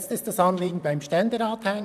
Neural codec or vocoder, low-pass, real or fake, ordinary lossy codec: vocoder, 44.1 kHz, 128 mel bands, Pupu-Vocoder; 14.4 kHz; fake; none